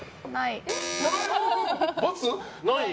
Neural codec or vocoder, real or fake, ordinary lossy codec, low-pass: none; real; none; none